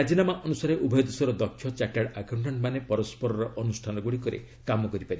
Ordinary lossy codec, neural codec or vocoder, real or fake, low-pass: none; none; real; none